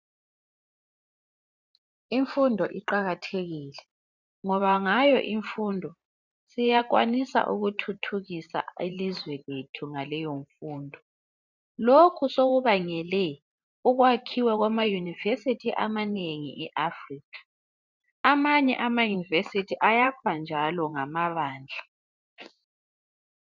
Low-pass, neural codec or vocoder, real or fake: 7.2 kHz; vocoder, 44.1 kHz, 128 mel bands every 512 samples, BigVGAN v2; fake